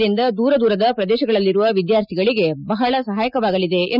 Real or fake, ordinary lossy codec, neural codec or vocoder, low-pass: real; none; none; 5.4 kHz